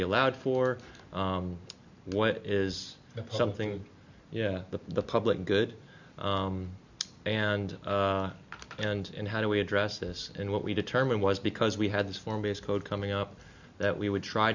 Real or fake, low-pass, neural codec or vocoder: real; 7.2 kHz; none